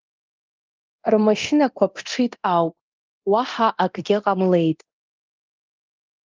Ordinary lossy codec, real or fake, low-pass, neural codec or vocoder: Opus, 24 kbps; fake; 7.2 kHz; codec, 24 kHz, 0.9 kbps, DualCodec